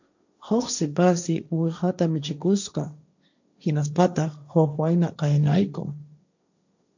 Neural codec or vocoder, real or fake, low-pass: codec, 16 kHz, 1.1 kbps, Voila-Tokenizer; fake; 7.2 kHz